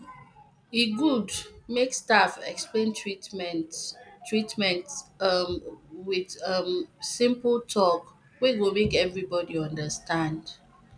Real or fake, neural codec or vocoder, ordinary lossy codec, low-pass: real; none; none; 9.9 kHz